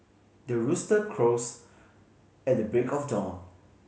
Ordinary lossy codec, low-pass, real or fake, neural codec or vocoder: none; none; real; none